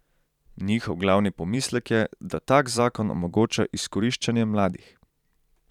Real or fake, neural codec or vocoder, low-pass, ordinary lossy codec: fake; vocoder, 44.1 kHz, 128 mel bands every 512 samples, BigVGAN v2; 19.8 kHz; none